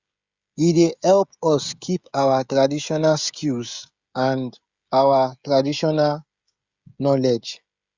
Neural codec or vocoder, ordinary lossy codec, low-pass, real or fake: codec, 16 kHz, 16 kbps, FreqCodec, smaller model; Opus, 64 kbps; 7.2 kHz; fake